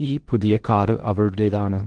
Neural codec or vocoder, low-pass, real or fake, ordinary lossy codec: codec, 16 kHz in and 24 kHz out, 0.6 kbps, FocalCodec, streaming, 2048 codes; 9.9 kHz; fake; Opus, 16 kbps